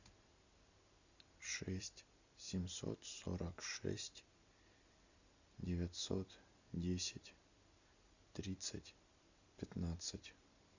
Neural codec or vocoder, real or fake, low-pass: none; real; 7.2 kHz